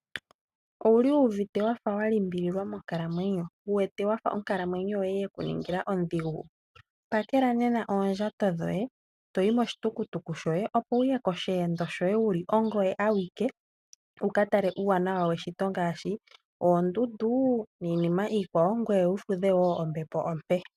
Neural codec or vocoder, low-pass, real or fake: none; 14.4 kHz; real